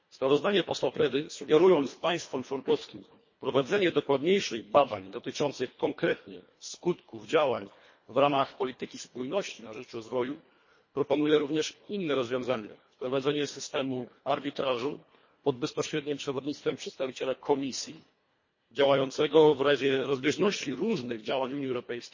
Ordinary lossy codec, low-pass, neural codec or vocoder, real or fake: MP3, 32 kbps; 7.2 kHz; codec, 24 kHz, 1.5 kbps, HILCodec; fake